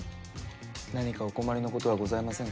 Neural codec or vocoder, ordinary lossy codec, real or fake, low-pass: none; none; real; none